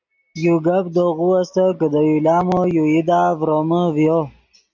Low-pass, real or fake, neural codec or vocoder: 7.2 kHz; real; none